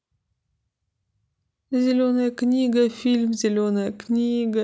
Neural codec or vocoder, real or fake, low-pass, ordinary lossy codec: none; real; none; none